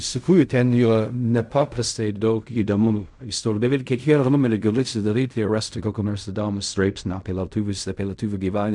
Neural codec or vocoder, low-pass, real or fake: codec, 16 kHz in and 24 kHz out, 0.4 kbps, LongCat-Audio-Codec, fine tuned four codebook decoder; 10.8 kHz; fake